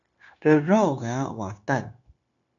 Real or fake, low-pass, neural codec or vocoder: fake; 7.2 kHz; codec, 16 kHz, 0.9 kbps, LongCat-Audio-Codec